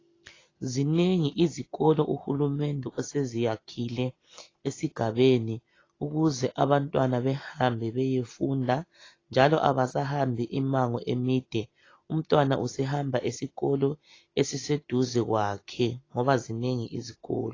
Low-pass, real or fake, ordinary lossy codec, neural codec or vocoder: 7.2 kHz; real; AAC, 32 kbps; none